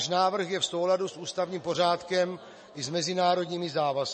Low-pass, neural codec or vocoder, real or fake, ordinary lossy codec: 10.8 kHz; none; real; MP3, 32 kbps